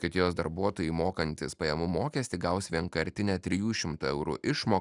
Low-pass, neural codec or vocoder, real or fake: 10.8 kHz; none; real